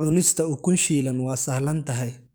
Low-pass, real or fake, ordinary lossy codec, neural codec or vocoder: none; fake; none; codec, 44.1 kHz, 7.8 kbps, DAC